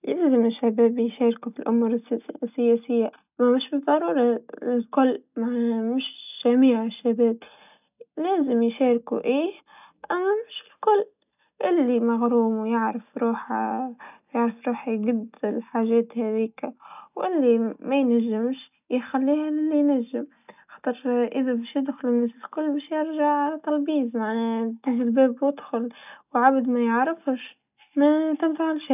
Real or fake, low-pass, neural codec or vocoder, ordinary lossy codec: real; 3.6 kHz; none; none